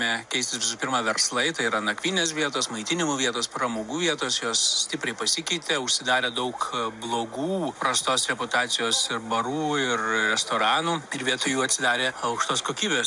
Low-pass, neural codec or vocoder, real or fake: 10.8 kHz; none; real